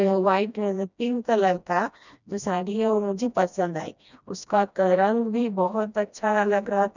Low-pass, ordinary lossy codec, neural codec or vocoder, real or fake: 7.2 kHz; none; codec, 16 kHz, 1 kbps, FreqCodec, smaller model; fake